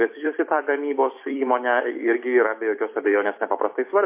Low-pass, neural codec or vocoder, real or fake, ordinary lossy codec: 3.6 kHz; vocoder, 44.1 kHz, 128 mel bands every 256 samples, BigVGAN v2; fake; MP3, 24 kbps